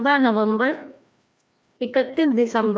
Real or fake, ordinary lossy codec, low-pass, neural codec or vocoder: fake; none; none; codec, 16 kHz, 1 kbps, FreqCodec, larger model